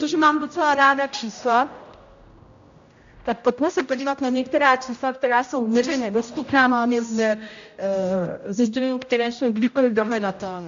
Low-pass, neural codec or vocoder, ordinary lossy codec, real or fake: 7.2 kHz; codec, 16 kHz, 0.5 kbps, X-Codec, HuBERT features, trained on general audio; AAC, 64 kbps; fake